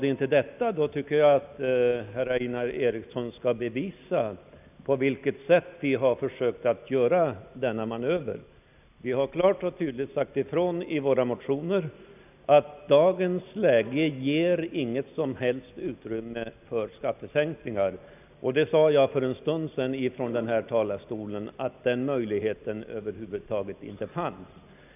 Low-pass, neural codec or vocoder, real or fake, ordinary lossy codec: 3.6 kHz; none; real; none